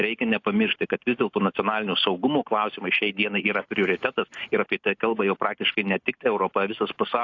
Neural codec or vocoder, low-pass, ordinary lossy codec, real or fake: none; 7.2 kHz; AAC, 48 kbps; real